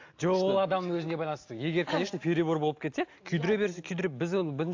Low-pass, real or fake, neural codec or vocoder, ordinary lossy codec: 7.2 kHz; real; none; AAC, 48 kbps